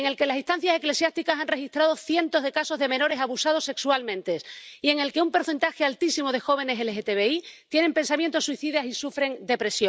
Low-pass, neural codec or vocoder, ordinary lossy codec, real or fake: none; none; none; real